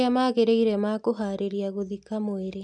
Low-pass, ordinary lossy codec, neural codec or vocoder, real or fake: 10.8 kHz; none; none; real